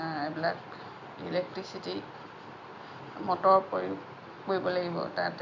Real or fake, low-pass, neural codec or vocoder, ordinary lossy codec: real; 7.2 kHz; none; none